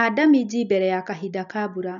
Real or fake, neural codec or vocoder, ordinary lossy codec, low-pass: real; none; none; 7.2 kHz